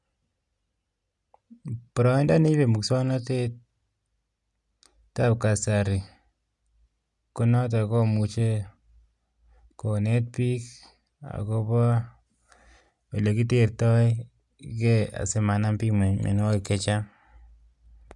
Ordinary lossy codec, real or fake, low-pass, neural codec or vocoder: none; real; 10.8 kHz; none